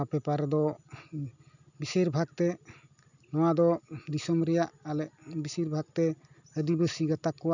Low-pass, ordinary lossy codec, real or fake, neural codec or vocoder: 7.2 kHz; none; real; none